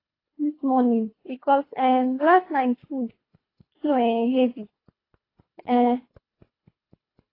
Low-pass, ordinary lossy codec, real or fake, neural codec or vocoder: 5.4 kHz; AAC, 24 kbps; fake; codec, 24 kHz, 3 kbps, HILCodec